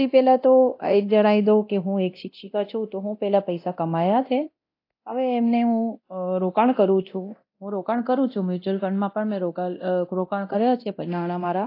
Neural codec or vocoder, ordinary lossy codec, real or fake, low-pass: codec, 24 kHz, 0.9 kbps, DualCodec; AAC, 32 kbps; fake; 5.4 kHz